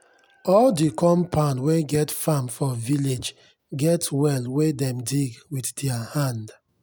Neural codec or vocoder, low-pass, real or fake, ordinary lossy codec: none; none; real; none